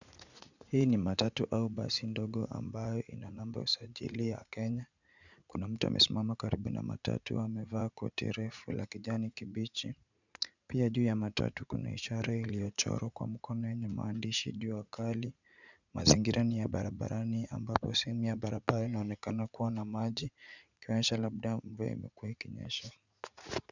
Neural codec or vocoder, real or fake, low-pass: vocoder, 44.1 kHz, 80 mel bands, Vocos; fake; 7.2 kHz